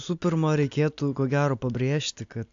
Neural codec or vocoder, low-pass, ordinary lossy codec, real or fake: none; 7.2 kHz; AAC, 64 kbps; real